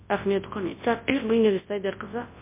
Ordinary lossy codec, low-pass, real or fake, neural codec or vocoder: MP3, 24 kbps; 3.6 kHz; fake; codec, 24 kHz, 0.9 kbps, WavTokenizer, large speech release